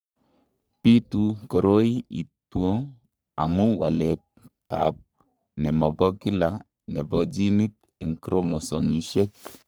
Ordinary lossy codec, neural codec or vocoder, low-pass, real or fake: none; codec, 44.1 kHz, 3.4 kbps, Pupu-Codec; none; fake